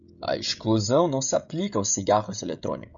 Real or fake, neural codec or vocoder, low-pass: fake; codec, 16 kHz, 16 kbps, FreqCodec, smaller model; 7.2 kHz